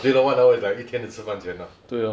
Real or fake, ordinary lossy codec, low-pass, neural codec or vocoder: real; none; none; none